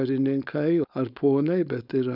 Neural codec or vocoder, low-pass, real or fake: codec, 16 kHz, 4.8 kbps, FACodec; 5.4 kHz; fake